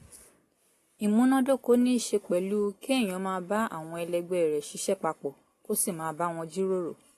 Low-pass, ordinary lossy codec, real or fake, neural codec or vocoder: 14.4 kHz; AAC, 48 kbps; real; none